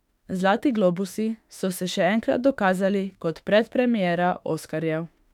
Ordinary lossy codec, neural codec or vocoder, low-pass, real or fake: none; autoencoder, 48 kHz, 32 numbers a frame, DAC-VAE, trained on Japanese speech; 19.8 kHz; fake